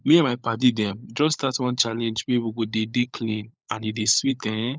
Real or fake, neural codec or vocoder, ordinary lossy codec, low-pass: fake; codec, 16 kHz, 16 kbps, FunCodec, trained on LibriTTS, 50 frames a second; none; none